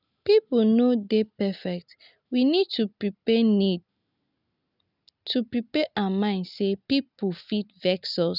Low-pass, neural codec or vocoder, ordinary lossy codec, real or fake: 5.4 kHz; none; none; real